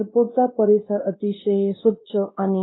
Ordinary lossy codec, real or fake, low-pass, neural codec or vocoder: AAC, 16 kbps; fake; 7.2 kHz; codec, 16 kHz, 1 kbps, X-Codec, WavLM features, trained on Multilingual LibriSpeech